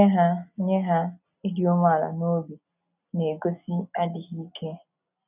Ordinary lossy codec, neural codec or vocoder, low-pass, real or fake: none; none; 3.6 kHz; real